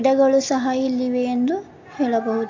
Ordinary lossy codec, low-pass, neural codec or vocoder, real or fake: MP3, 48 kbps; 7.2 kHz; none; real